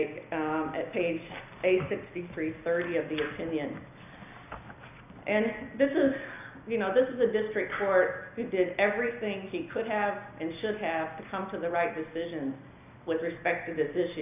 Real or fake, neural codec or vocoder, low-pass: real; none; 3.6 kHz